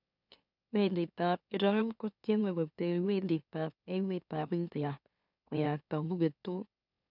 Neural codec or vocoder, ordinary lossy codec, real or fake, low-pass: autoencoder, 44.1 kHz, a latent of 192 numbers a frame, MeloTTS; none; fake; 5.4 kHz